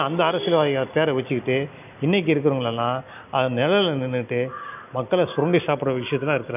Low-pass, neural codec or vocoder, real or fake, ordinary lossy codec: 3.6 kHz; none; real; none